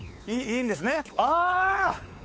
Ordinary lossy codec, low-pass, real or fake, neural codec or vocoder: none; none; fake; codec, 16 kHz, 4 kbps, X-Codec, WavLM features, trained on Multilingual LibriSpeech